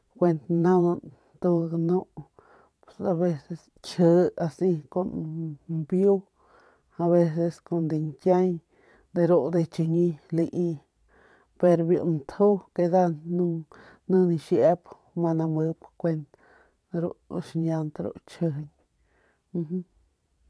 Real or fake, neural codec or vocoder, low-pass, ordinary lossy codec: fake; vocoder, 22.05 kHz, 80 mel bands, Vocos; none; none